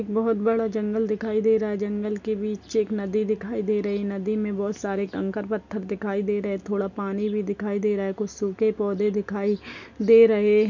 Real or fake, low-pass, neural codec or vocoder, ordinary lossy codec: real; 7.2 kHz; none; none